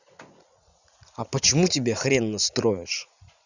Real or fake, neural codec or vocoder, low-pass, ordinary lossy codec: fake; vocoder, 44.1 kHz, 80 mel bands, Vocos; 7.2 kHz; none